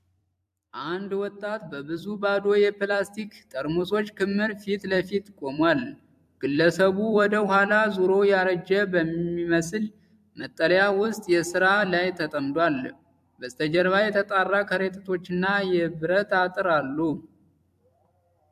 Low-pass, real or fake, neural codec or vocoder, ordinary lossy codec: 14.4 kHz; fake; vocoder, 44.1 kHz, 128 mel bands every 256 samples, BigVGAN v2; AAC, 96 kbps